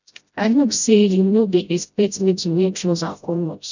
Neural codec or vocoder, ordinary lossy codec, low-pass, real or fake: codec, 16 kHz, 0.5 kbps, FreqCodec, smaller model; none; 7.2 kHz; fake